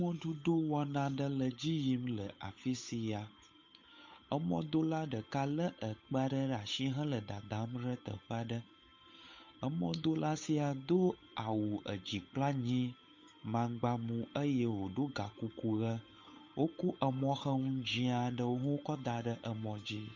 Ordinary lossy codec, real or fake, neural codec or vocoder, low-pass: MP3, 64 kbps; fake; codec, 16 kHz, 8 kbps, FunCodec, trained on Chinese and English, 25 frames a second; 7.2 kHz